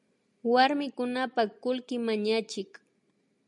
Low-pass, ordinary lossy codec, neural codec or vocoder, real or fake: 10.8 kHz; MP3, 96 kbps; none; real